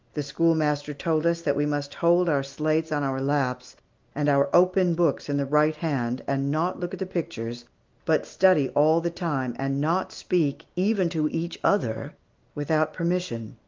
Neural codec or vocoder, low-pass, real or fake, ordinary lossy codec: none; 7.2 kHz; real; Opus, 32 kbps